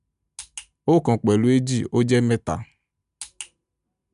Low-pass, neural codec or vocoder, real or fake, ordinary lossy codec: 10.8 kHz; none; real; none